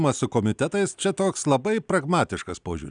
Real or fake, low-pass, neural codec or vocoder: real; 9.9 kHz; none